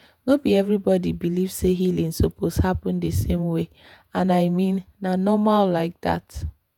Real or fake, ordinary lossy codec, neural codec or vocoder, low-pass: fake; none; vocoder, 48 kHz, 128 mel bands, Vocos; 19.8 kHz